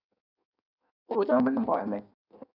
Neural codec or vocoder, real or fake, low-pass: codec, 16 kHz in and 24 kHz out, 1.1 kbps, FireRedTTS-2 codec; fake; 5.4 kHz